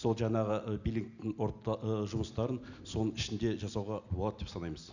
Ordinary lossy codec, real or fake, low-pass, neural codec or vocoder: none; real; 7.2 kHz; none